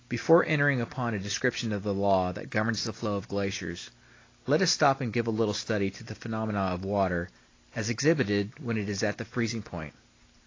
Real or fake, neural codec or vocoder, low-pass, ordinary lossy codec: real; none; 7.2 kHz; AAC, 32 kbps